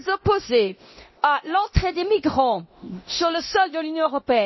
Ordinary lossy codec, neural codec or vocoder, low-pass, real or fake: MP3, 24 kbps; codec, 24 kHz, 0.9 kbps, DualCodec; 7.2 kHz; fake